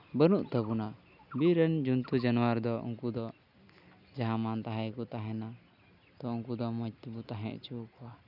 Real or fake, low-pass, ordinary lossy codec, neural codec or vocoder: real; 5.4 kHz; none; none